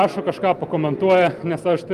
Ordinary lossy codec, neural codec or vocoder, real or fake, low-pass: Opus, 32 kbps; none; real; 14.4 kHz